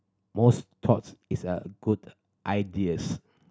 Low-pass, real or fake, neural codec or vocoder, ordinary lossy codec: none; real; none; none